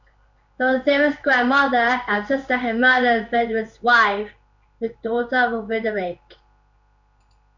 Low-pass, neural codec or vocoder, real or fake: 7.2 kHz; codec, 16 kHz in and 24 kHz out, 1 kbps, XY-Tokenizer; fake